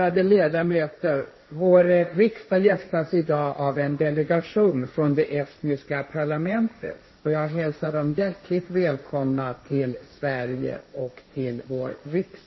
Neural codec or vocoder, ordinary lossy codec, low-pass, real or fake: codec, 16 kHz, 1.1 kbps, Voila-Tokenizer; MP3, 24 kbps; 7.2 kHz; fake